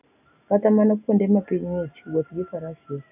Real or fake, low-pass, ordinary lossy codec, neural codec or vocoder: real; 3.6 kHz; none; none